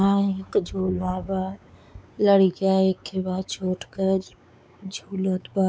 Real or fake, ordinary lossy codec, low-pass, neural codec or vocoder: fake; none; none; codec, 16 kHz, 4 kbps, X-Codec, WavLM features, trained on Multilingual LibriSpeech